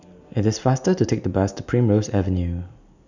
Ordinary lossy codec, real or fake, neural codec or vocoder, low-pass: none; real; none; 7.2 kHz